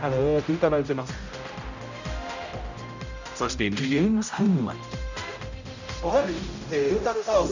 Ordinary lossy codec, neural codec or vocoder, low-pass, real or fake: none; codec, 16 kHz, 0.5 kbps, X-Codec, HuBERT features, trained on general audio; 7.2 kHz; fake